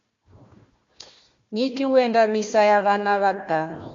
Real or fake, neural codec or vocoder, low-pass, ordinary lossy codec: fake; codec, 16 kHz, 1 kbps, FunCodec, trained on Chinese and English, 50 frames a second; 7.2 kHz; MP3, 48 kbps